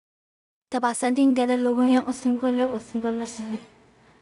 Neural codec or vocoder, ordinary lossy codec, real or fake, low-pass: codec, 16 kHz in and 24 kHz out, 0.4 kbps, LongCat-Audio-Codec, two codebook decoder; none; fake; 10.8 kHz